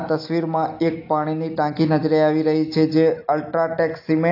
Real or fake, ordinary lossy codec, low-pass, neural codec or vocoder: real; AAC, 32 kbps; 5.4 kHz; none